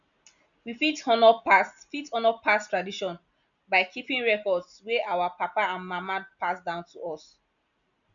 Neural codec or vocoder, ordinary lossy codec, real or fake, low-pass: none; none; real; 7.2 kHz